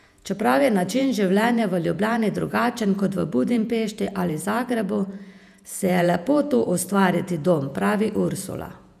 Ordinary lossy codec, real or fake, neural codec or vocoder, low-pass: none; fake; vocoder, 48 kHz, 128 mel bands, Vocos; 14.4 kHz